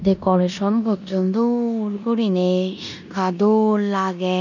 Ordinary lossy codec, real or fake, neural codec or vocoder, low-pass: none; fake; codec, 16 kHz in and 24 kHz out, 0.9 kbps, LongCat-Audio-Codec, four codebook decoder; 7.2 kHz